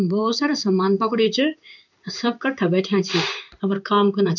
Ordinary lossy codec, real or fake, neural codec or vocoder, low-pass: none; fake; codec, 16 kHz in and 24 kHz out, 1 kbps, XY-Tokenizer; 7.2 kHz